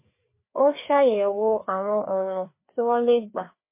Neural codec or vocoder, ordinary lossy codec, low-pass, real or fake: codec, 16 kHz, 2 kbps, FreqCodec, larger model; MP3, 24 kbps; 3.6 kHz; fake